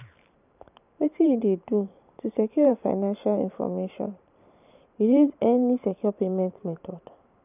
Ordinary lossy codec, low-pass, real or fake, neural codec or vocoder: none; 3.6 kHz; fake; vocoder, 44.1 kHz, 128 mel bands every 512 samples, BigVGAN v2